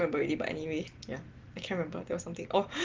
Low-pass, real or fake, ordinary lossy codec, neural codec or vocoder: 7.2 kHz; real; Opus, 16 kbps; none